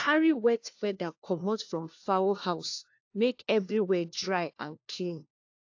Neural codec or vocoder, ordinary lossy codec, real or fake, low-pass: codec, 16 kHz, 1 kbps, FunCodec, trained on LibriTTS, 50 frames a second; AAC, 48 kbps; fake; 7.2 kHz